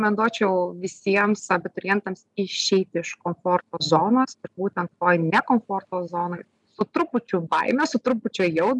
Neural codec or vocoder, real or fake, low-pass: none; real; 10.8 kHz